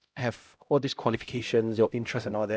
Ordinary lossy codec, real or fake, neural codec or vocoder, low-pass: none; fake; codec, 16 kHz, 0.5 kbps, X-Codec, HuBERT features, trained on LibriSpeech; none